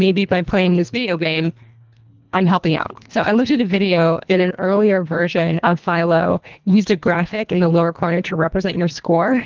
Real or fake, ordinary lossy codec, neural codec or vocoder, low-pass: fake; Opus, 24 kbps; codec, 24 kHz, 1.5 kbps, HILCodec; 7.2 kHz